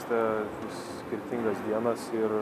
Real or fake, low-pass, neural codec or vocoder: real; 14.4 kHz; none